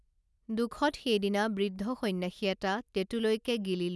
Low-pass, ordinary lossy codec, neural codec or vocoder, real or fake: none; none; none; real